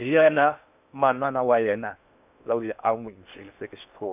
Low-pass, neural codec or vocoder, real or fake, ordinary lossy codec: 3.6 kHz; codec, 16 kHz in and 24 kHz out, 0.6 kbps, FocalCodec, streaming, 4096 codes; fake; none